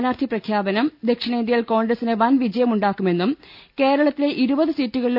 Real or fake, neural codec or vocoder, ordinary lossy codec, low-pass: real; none; none; 5.4 kHz